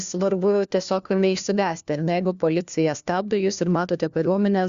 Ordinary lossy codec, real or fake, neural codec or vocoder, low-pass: Opus, 64 kbps; fake; codec, 16 kHz, 1 kbps, FunCodec, trained on LibriTTS, 50 frames a second; 7.2 kHz